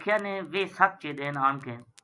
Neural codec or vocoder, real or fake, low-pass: vocoder, 44.1 kHz, 128 mel bands every 256 samples, BigVGAN v2; fake; 10.8 kHz